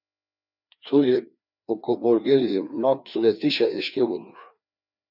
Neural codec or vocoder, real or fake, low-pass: codec, 16 kHz, 2 kbps, FreqCodec, larger model; fake; 5.4 kHz